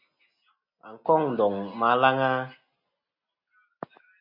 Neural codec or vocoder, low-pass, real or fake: none; 5.4 kHz; real